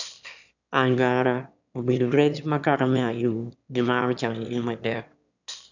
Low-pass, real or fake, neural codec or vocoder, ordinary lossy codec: 7.2 kHz; fake; autoencoder, 22.05 kHz, a latent of 192 numbers a frame, VITS, trained on one speaker; none